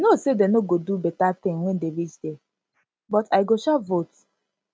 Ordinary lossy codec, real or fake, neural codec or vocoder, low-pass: none; real; none; none